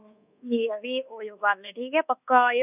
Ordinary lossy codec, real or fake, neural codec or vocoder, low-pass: none; fake; codec, 24 kHz, 1.2 kbps, DualCodec; 3.6 kHz